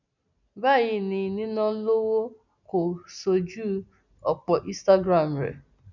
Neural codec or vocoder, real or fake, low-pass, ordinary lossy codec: none; real; 7.2 kHz; none